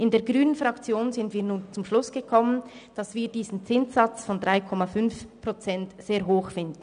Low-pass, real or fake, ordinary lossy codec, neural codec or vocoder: 9.9 kHz; real; none; none